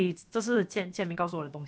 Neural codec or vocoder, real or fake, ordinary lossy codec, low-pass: codec, 16 kHz, about 1 kbps, DyCAST, with the encoder's durations; fake; none; none